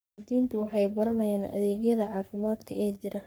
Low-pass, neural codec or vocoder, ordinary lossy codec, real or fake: none; codec, 44.1 kHz, 3.4 kbps, Pupu-Codec; none; fake